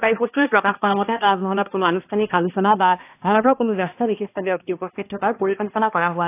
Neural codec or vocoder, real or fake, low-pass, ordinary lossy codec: codec, 16 kHz, 1 kbps, X-Codec, HuBERT features, trained on balanced general audio; fake; 3.6 kHz; AAC, 24 kbps